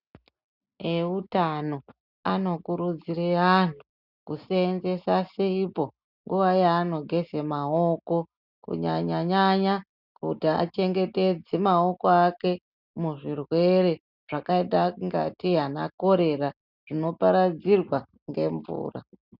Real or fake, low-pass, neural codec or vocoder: real; 5.4 kHz; none